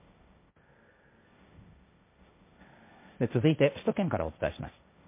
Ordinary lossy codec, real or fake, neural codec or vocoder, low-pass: MP3, 24 kbps; fake; codec, 16 kHz, 1.1 kbps, Voila-Tokenizer; 3.6 kHz